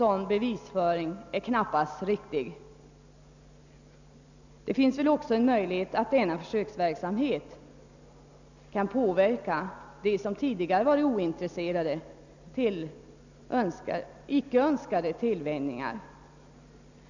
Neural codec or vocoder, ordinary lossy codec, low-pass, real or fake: none; none; 7.2 kHz; real